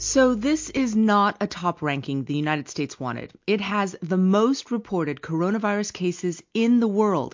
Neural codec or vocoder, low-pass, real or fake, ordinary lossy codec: none; 7.2 kHz; real; MP3, 48 kbps